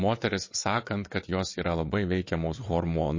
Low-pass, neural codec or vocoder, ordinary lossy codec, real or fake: 7.2 kHz; vocoder, 22.05 kHz, 80 mel bands, WaveNeXt; MP3, 32 kbps; fake